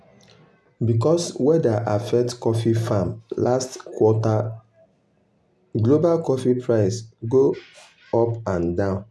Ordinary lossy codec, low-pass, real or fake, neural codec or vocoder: none; none; real; none